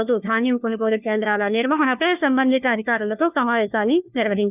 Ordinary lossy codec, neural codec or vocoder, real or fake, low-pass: none; codec, 16 kHz, 1 kbps, FunCodec, trained on LibriTTS, 50 frames a second; fake; 3.6 kHz